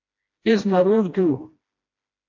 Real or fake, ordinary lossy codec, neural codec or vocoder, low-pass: fake; AAC, 32 kbps; codec, 16 kHz, 1 kbps, FreqCodec, smaller model; 7.2 kHz